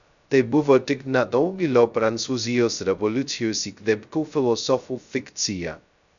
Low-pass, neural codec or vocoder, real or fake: 7.2 kHz; codec, 16 kHz, 0.2 kbps, FocalCodec; fake